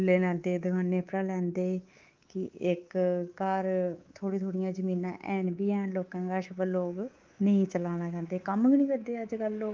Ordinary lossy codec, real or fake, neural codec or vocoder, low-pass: Opus, 24 kbps; fake; codec, 24 kHz, 3.1 kbps, DualCodec; 7.2 kHz